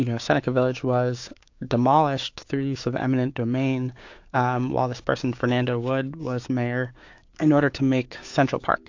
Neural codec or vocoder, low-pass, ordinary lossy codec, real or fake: codec, 16 kHz, 6 kbps, DAC; 7.2 kHz; AAC, 48 kbps; fake